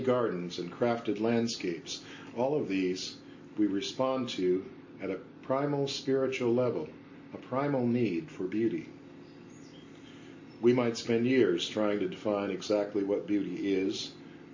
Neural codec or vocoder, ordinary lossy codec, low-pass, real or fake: none; MP3, 32 kbps; 7.2 kHz; real